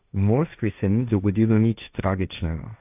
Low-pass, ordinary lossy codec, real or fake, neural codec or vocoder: 3.6 kHz; AAC, 32 kbps; fake; codec, 16 kHz, 1.1 kbps, Voila-Tokenizer